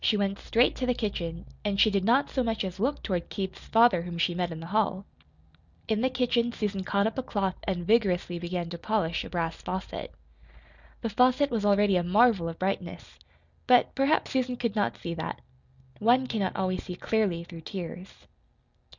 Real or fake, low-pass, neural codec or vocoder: real; 7.2 kHz; none